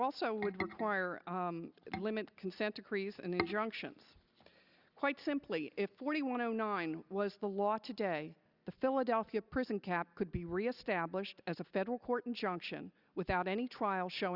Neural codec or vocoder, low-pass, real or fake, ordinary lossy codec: none; 5.4 kHz; real; Opus, 64 kbps